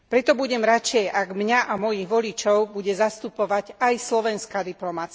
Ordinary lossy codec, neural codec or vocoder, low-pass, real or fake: none; none; none; real